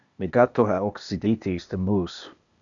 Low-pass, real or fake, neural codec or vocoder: 7.2 kHz; fake; codec, 16 kHz, 0.8 kbps, ZipCodec